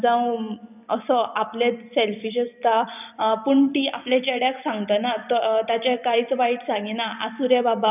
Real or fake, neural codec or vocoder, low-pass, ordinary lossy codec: fake; vocoder, 44.1 kHz, 128 mel bands every 256 samples, BigVGAN v2; 3.6 kHz; none